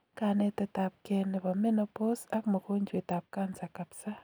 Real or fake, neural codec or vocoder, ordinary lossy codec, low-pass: real; none; none; none